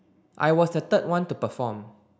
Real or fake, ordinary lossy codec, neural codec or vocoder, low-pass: real; none; none; none